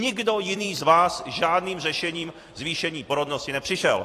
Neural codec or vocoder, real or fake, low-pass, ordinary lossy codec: vocoder, 44.1 kHz, 128 mel bands every 256 samples, BigVGAN v2; fake; 14.4 kHz; AAC, 48 kbps